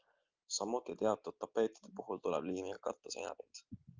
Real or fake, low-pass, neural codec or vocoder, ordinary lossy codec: real; 7.2 kHz; none; Opus, 24 kbps